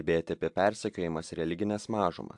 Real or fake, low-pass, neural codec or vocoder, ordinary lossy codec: real; 10.8 kHz; none; AAC, 64 kbps